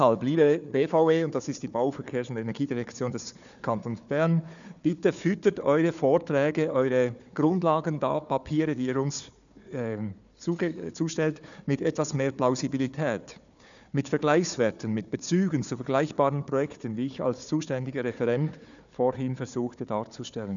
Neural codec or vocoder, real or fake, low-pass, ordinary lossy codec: codec, 16 kHz, 4 kbps, FunCodec, trained on Chinese and English, 50 frames a second; fake; 7.2 kHz; none